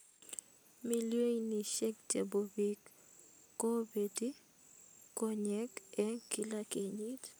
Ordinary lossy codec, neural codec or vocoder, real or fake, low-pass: none; none; real; none